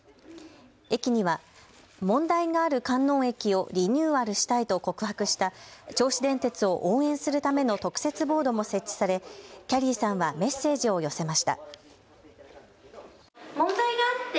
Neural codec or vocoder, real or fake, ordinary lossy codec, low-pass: none; real; none; none